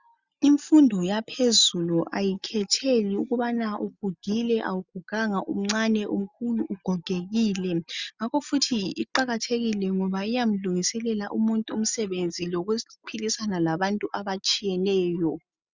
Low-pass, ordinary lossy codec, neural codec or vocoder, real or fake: 7.2 kHz; Opus, 64 kbps; none; real